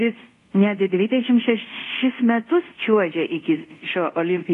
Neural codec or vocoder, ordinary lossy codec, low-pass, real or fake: codec, 24 kHz, 0.5 kbps, DualCodec; AAC, 48 kbps; 10.8 kHz; fake